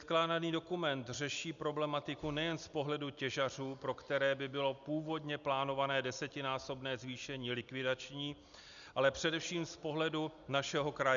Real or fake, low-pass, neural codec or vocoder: real; 7.2 kHz; none